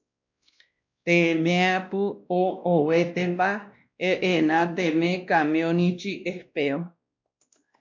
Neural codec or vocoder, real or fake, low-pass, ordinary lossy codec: codec, 16 kHz, 1 kbps, X-Codec, WavLM features, trained on Multilingual LibriSpeech; fake; 7.2 kHz; MP3, 64 kbps